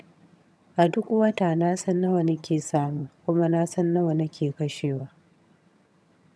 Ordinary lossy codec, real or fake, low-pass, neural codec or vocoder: none; fake; none; vocoder, 22.05 kHz, 80 mel bands, HiFi-GAN